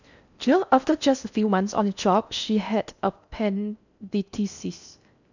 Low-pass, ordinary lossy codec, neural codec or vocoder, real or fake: 7.2 kHz; none; codec, 16 kHz in and 24 kHz out, 0.6 kbps, FocalCodec, streaming, 4096 codes; fake